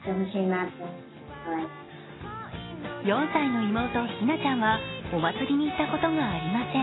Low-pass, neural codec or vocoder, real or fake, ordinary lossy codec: 7.2 kHz; none; real; AAC, 16 kbps